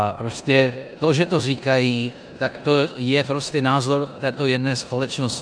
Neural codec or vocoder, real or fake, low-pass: codec, 16 kHz in and 24 kHz out, 0.9 kbps, LongCat-Audio-Codec, four codebook decoder; fake; 9.9 kHz